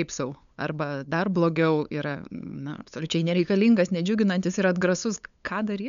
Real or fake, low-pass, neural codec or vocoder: fake; 7.2 kHz; codec, 16 kHz, 8 kbps, FunCodec, trained on LibriTTS, 25 frames a second